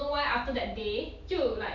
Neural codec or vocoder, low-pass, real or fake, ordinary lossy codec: none; 7.2 kHz; real; none